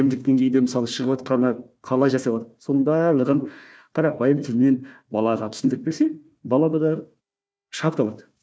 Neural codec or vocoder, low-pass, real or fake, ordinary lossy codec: codec, 16 kHz, 1 kbps, FunCodec, trained on Chinese and English, 50 frames a second; none; fake; none